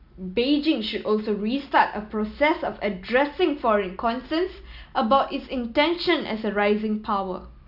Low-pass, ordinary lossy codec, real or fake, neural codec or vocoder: 5.4 kHz; none; real; none